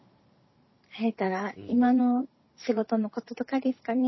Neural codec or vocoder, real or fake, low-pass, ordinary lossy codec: vocoder, 44.1 kHz, 128 mel bands every 512 samples, BigVGAN v2; fake; 7.2 kHz; MP3, 24 kbps